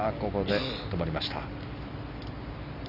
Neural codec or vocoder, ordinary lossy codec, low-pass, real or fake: none; none; 5.4 kHz; real